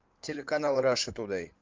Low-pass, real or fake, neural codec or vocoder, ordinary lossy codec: 7.2 kHz; fake; codec, 16 kHz in and 24 kHz out, 2.2 kbps, FireRedTTS-2 codec; Opus, 32 kbps